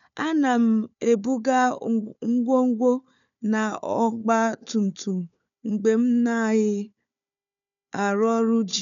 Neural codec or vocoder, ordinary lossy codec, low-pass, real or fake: codec, 16 kHz, 4 kbps, FunCodec, trained on Chinese and English, 50 frames a second; none; 7.2 kHz; fake